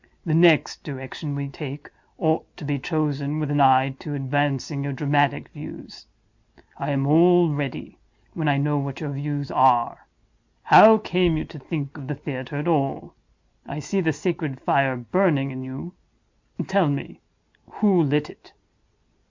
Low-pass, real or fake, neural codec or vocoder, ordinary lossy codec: 7.2 kHz; real; none; MP3, 64 kbps